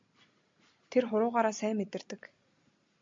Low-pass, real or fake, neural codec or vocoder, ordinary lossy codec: 7.2 kHz; real; none; MP3, 48 kbps